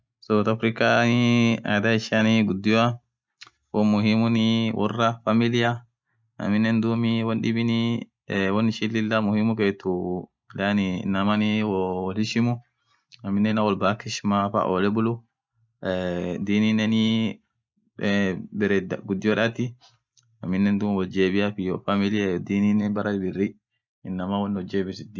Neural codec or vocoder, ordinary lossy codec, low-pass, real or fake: none; none; none; real